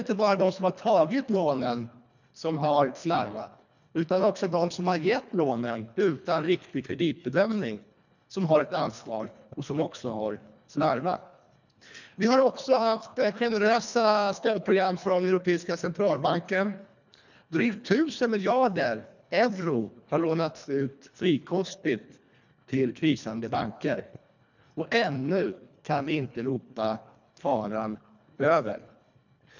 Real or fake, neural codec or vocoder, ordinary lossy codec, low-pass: fake; codec, 24 kHz, 1.5 kbps, HILCodec; none; 7.2 kHz